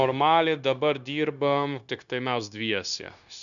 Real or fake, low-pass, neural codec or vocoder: fake; 7.2 kHz; codec, 16 kHz, 0.9 kbps, LongCat-Audio-Codec